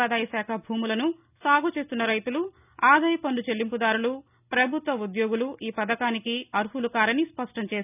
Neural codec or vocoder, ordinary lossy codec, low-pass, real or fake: none; none; 3.6 kHz; real